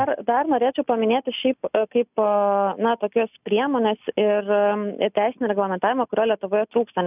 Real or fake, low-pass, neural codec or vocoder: real; 3.6 kHz; none